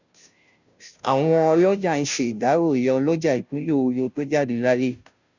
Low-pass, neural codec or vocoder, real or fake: 7.2 kHz; codec, 16 kHz, 0.5 kbps, FunCodec, trained on Chinese and English, 25 frames a second; fake